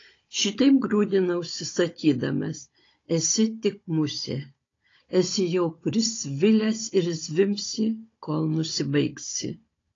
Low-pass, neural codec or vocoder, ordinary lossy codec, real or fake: 7.2 kHz; codec, 16 kHz, 16 kbps, FunCodec, trained on Chinese and English, 50 frames a second; AAC, 32 kbps; fake